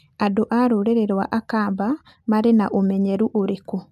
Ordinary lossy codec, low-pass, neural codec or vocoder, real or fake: AAC, 96 kbps; 14.4 kHz; none; real